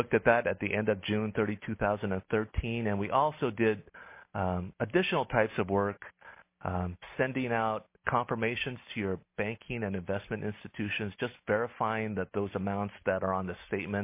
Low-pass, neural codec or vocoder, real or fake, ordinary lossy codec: 3.6 kHz; none; real; MP3, 24 kbps